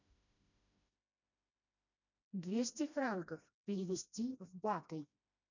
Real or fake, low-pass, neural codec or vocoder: fake; 7.2 kHz; codec, 16 kHz, 1 kbps, FreqCodec, smaller model